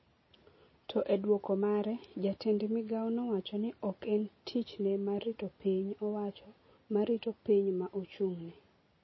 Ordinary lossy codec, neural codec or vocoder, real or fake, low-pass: MP3, 24 kbps; none; real; 7.2 kHz